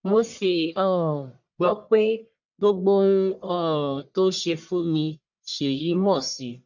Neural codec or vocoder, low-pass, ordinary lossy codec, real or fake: codec, 44.1 kHz, 1.7 kbps, Pupu-Codec; 7.2 kHz; none; fake